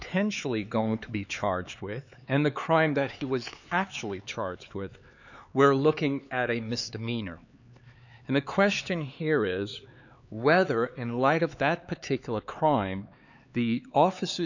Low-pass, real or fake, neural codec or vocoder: 7.2 kHz; fake; codec, 16 kHz, 4 kbps, X-Codec, HuBERT features, trained on LibriSpeech